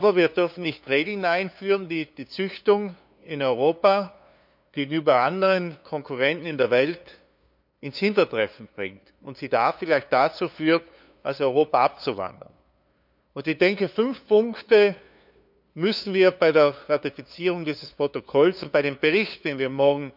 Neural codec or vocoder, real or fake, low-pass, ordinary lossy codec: codec, 16 kHz, 2 kbps, FunCodec, trained on LibriTTS, 25 frames a second; fake; 5.4 kHz; none